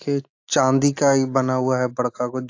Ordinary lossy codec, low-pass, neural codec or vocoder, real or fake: AAC, 48 kbps; 7.2 kHz; none; real